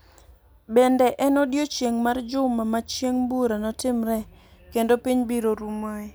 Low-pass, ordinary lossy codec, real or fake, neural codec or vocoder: none; none; real; none